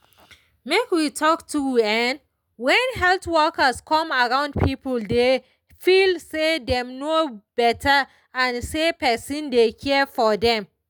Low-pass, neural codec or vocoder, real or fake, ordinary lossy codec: none; none; real; none